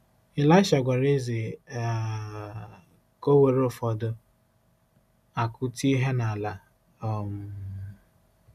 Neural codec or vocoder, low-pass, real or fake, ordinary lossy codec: none; 14.4 kHz; real; none